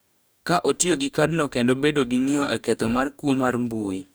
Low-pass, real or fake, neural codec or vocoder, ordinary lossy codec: none; fake; codec, 44.1 kHz, 2.6 kbps, DAC; none